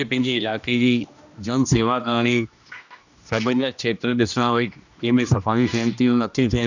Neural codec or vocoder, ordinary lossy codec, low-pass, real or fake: codec, 16 kHz, 1 kbps, X-Codec, HuBERT features, trained on general audio; none; 7.2 kHz; fake